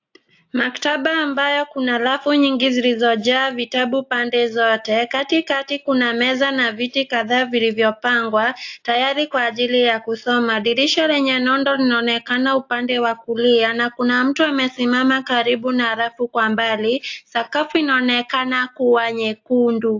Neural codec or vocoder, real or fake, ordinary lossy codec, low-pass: none; real; AAC, 48 kbps; 7.2 kHz